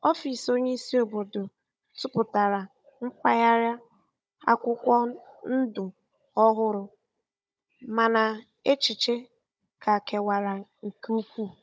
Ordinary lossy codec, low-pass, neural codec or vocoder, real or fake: none; none; codec, 16 kHz, 16 kbps, FunCodec, trained on Chinese and English, 50 frames a second; fake